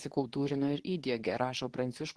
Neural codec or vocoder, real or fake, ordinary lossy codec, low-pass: codec, 24 kHz, 0.9 kbps, WavTokenizer, medium speech release version 2; fake; Opus, 16 kbps; 10.8 kHz